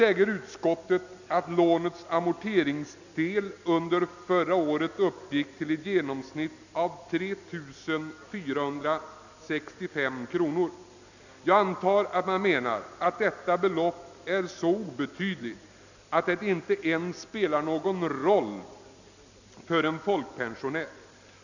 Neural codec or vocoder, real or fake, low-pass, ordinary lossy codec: none; real; 7.2 kHz; none